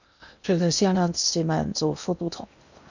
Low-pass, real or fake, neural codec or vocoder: 7.2 kHz; fake; codec, 16 kHz in and 24 kHz out, 0.6 kbps, FocalCodec, streaming, 2048 codes